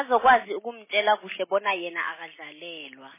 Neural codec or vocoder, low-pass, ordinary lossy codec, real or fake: none; 3.6 kHz; MP3, 16 kbps; real